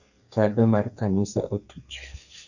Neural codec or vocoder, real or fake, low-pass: codec, 32 kHz, 1.9 kbps, SNAC; fake; 7.2 kHz